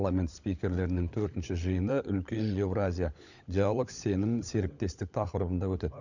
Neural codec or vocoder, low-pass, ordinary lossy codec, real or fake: codec, 16 kHz, 16 kbps, FunCodec, trained on LibriTTS, 50 frames a second; 7.2 kHz; none; fake